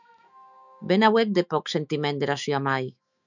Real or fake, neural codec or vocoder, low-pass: fake; autoencoder, 48 kHz, 128 numbers a frame, DAC-VAE, trained on Japanese speech; 7.2 kHz